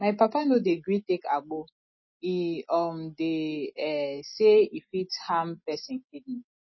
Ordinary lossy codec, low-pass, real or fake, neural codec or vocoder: MP3, 24 kbps; 7.2 kHz; real; none